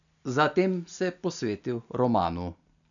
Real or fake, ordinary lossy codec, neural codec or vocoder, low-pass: real; none; none; 7.2 kHz